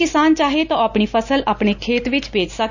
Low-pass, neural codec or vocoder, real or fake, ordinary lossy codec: 7.2 kHz; none; real; none